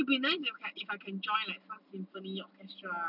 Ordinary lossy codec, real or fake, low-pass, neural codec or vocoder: none; real; 5.4 kHz; none